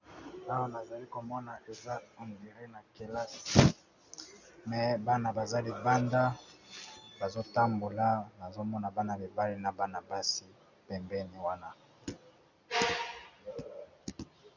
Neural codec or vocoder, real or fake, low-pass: none; real; 7.2 kHz